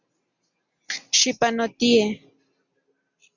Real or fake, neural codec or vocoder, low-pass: real; none; 7.2 kHz